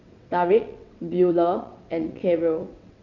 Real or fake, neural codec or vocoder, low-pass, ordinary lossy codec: fake; vocoder, 22.05 kHz, 80 mel bands, WaveNeXt; 7.2 kHz; none